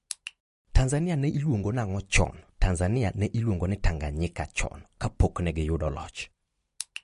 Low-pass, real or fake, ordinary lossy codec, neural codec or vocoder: 14.4 kHz; real; MP3, 48 kbps; none